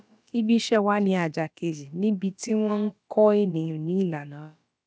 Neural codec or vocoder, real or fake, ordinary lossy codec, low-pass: codec, 16 kHz, about 1 kbps, DyCAST, with the encoder's durations; fake; none; none